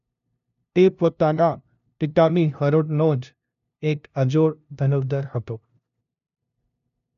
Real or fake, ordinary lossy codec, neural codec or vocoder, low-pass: fake; none; codec, 16 kHz, 0.5 kbps, FunCodec, trained on LibriTTS, 25 frames a second; 7.2 kHz